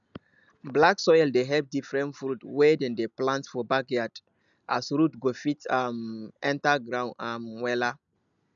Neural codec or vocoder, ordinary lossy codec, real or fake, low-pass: none; none; real; 7.2 kHz